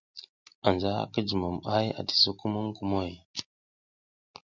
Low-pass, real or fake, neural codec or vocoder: 7.2 kHz; real; none